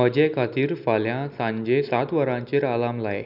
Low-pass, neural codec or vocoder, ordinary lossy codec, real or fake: 5.4 kHz; none; none; real